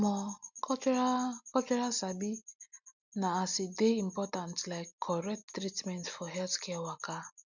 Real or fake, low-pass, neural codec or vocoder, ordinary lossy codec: real; 7.2 kHz; none; none